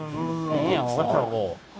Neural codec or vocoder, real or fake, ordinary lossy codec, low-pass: codec, 16 kHz, 0.9 kbps, LongCat-Audio-Codec; fake; none; none